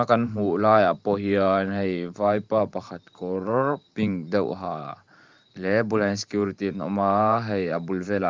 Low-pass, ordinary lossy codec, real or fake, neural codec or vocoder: 7.2 kHz; Opus, 32 kbps; fake; vocoder, 44.1 kHz, 128 mel bands every 512 samples, BigVGAN v2